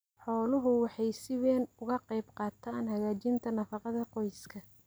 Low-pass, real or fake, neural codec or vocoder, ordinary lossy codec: none; real; none; none